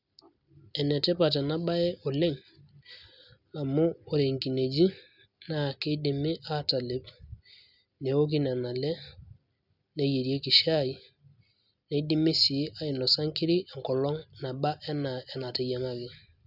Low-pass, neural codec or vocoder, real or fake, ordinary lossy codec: 5.4 kHz; none; real; none